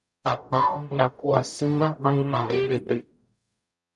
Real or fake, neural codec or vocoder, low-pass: fake; codec, 44.1 kHz, 0.9 kbps, DAC; 10.8 kHz